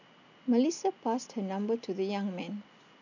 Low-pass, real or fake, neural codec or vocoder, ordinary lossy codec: 7.2 kHz; real; none; none